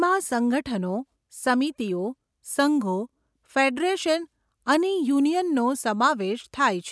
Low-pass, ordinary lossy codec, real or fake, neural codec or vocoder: none; none; real; none